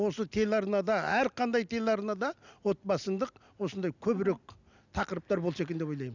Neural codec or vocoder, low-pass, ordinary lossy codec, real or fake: none; 7.2 kHz; none; real